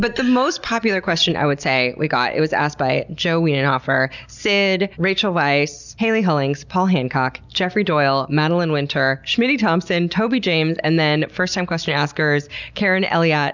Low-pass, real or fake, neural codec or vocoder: 7.2 kHz; real; none